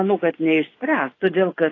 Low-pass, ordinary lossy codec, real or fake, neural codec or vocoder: 7.2 kHz; AAC, 32 kbps; fake; vocoder, 24 kHz, 100 mel bands, Vocos